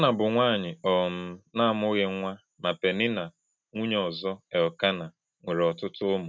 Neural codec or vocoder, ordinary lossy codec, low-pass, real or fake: none; none; none; real